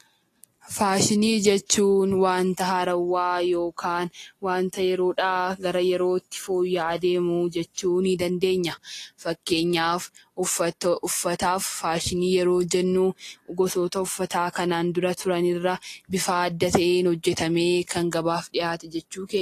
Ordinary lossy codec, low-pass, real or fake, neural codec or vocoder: AAC, 48 kbps; 14.4 kHz; real; none